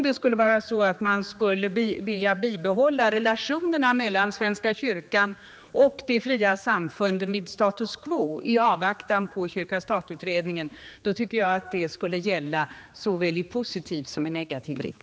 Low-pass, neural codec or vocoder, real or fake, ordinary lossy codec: none; codec, 16 kHz, 2 kbps, X-Codec, HuBERT features, trained on general audio; fake; none